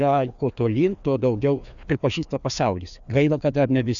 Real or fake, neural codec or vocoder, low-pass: fake; codec, 16 kHz, 2 kbps, FreqCodec, larger model; 7.2 kHz